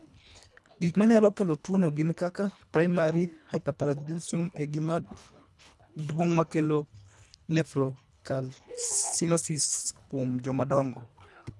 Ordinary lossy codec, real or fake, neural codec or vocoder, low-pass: none; fake; codec, 24 kHz, 1.5 kbps, HILCodec; none